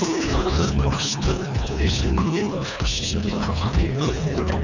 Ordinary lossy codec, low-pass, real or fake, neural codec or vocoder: none; 7.2 kHz; fake; codec, 24 kHz, 1.5 kbps, HILCodec